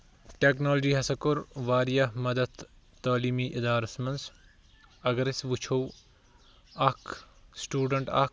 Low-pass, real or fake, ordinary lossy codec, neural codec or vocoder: none; real; none; none